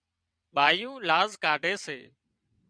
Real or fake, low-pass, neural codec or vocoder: fake; 9.9 kHz; vocoder, 22.05 kHz, 80 mel bands, WaveNeXt